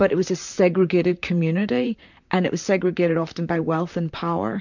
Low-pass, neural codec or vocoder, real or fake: 7.2 kHz; vocoder, 44.1 kHz, 128 mel bands, Pupu-Vocoder; fake